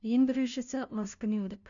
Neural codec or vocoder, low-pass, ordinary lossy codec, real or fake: codec, 16 kHz, 0.5 kbps, FunCodec, trained on LibriTTS, 25 frames a second; 7.2 kHz; MP3, 96 kbps; fake